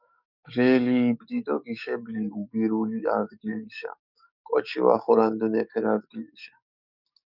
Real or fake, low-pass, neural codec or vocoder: fake; 5.4 kHz; codec, 44.1 kHz, 7.8 kbps, DAC